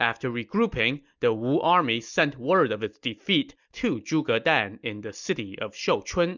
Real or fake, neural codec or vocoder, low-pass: real; none; 7.2 kHz